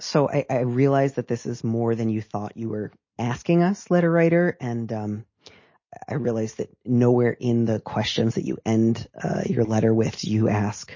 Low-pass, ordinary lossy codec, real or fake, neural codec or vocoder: 7.2 kHz; MP3, 32 kbps; real; none